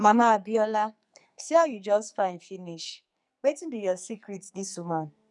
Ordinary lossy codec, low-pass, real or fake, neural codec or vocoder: none; 10.8 kHz; fake; codec, 44.1 kHz, 2.6 kbps, SNAC